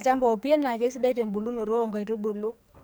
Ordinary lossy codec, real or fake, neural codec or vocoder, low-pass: none; fake; codec, 44.1 kHz, 2.6 kbps, SNAC; none